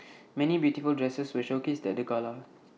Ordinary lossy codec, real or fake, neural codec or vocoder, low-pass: none; real; none; none